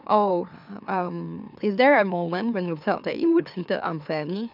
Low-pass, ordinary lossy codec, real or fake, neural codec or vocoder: 5.4 kHz; none; fake; autoencoder, 44.1 kHz, a latent of 192 numbers a frame, MeloTTS